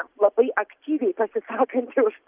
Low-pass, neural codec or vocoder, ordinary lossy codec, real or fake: 3.6 kHz; none; Opus, 24 kbps; real